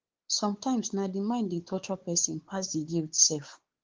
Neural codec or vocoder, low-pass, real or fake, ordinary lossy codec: codec, 16 kHz, 4 kbps, X-Codec, WavLM features, trained on Multilingual LibriSpeech; 7.2 kHz; fake; Opus, 16 kbps